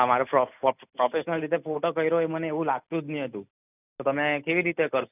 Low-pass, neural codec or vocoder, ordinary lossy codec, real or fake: 3.6 kHz; none; none; real